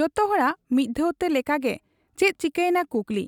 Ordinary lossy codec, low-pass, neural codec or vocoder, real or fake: none; 19.8 kHz; none; real